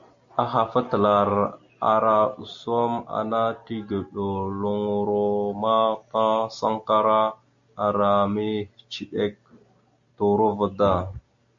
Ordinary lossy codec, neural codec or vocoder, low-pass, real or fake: MP3, 64 kbps; none; 7.2 kHz; real